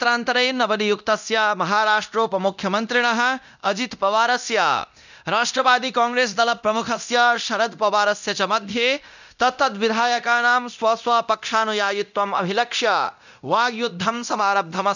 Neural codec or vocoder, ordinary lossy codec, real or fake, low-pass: codec, 24 kHz, 0.9 kbps, DualCodec; none; fake; 7.2 kHz